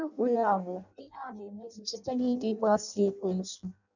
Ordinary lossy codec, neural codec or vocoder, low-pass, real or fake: none; codec, 16 kHz in and 24 kHz out, 0.6 kbps, FireRedTTS-2 codec; 7.2 kHz; fake